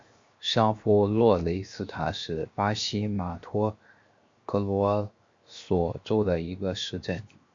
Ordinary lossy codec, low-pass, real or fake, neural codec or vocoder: MP3, 48 kbps; 7.2 kHz; fake; codec, 16 kHz, 0.7 kbps, FocalCodec